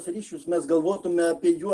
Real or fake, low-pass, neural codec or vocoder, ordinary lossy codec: real; 9.9 kHz; none; Opus, 16 kbps